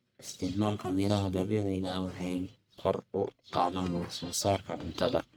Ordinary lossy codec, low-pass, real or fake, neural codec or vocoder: none; none; fake; codec, 44.1 kHz, 1.7 kbps, Pupu-Codec